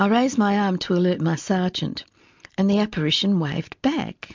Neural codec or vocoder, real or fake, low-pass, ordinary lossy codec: none; real; 7.2 kHz; MP3, 64 kbps